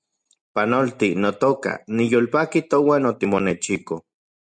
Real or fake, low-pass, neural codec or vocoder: real; 9.9 kHz; none